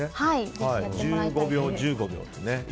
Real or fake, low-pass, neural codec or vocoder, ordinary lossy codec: real; none; none; none